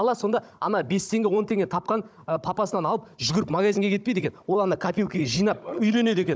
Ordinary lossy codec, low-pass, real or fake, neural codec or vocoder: none; none; fake; codec, 16 kHz, 16 kbps, FunCodec, trained on Chinese and English, 50 frames a second